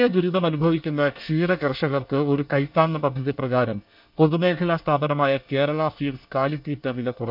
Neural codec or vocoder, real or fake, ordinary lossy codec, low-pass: codec, 24 kHz, 1 kbps, SNAC; fake; none; 5.4 kHz